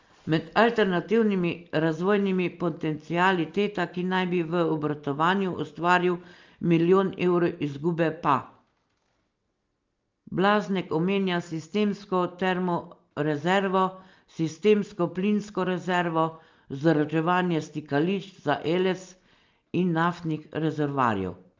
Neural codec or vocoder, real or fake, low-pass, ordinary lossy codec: none; real; 7.2 kHz; Opus, 32 kbps